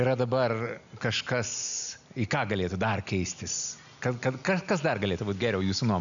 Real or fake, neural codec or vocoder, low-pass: real; none; 7.2 kHz